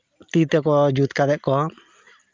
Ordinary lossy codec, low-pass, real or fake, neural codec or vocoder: Opus, 24 kbps; 7.2 kHz; real; none